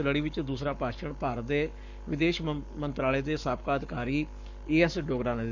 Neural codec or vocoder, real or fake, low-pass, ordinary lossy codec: codec, 44.1 kHz, 7.8 kbps, Pupu-Codec; fake; 7.2 kHz; none